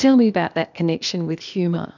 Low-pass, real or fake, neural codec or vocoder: 7.2 kHz; fake; codec, 16 kHz, 0.8 kbps, ZipCodec